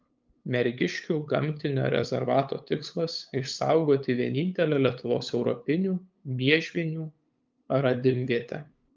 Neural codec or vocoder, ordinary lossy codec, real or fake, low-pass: codec, 16 kHz, 8 kbps, FunCodec, trained on LibriTTS, 25 frames a second; Opus, 32 kbps; fake; 7.2 kHz